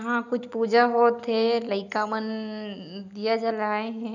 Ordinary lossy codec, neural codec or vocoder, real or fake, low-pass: none; none; real; 7.2 kHz